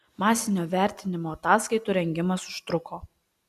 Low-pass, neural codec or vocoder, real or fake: 14.4 kHz; none; real